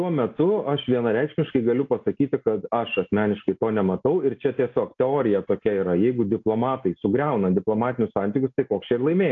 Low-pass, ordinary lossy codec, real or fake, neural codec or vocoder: 7.2 kHz; AAC, 48 kbps; real; none